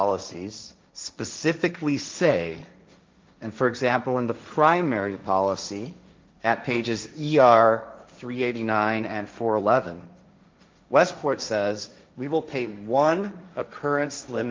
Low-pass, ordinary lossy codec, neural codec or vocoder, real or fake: 7.2 kHz; Opus, 24 kbps; codec, 16 kHz, 1.1 kbps, Voila-Tokenizer; fake